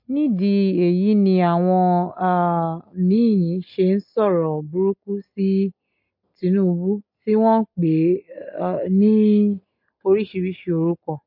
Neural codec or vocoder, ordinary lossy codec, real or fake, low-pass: none; MP3, 32 kbps; real; 5.4 kHz